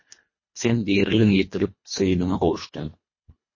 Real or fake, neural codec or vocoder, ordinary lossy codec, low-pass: fake; codec, 24 kHz, 1.5 kbps, HILCodec; MP3, 32 kbps; 7.2 kHz